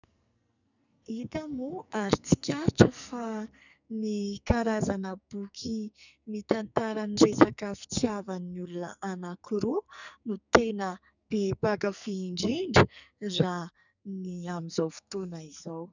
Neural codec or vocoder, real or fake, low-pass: codec, 44.1 kHz, 2.6 kbps, SNAC; fake; 7.2 kHz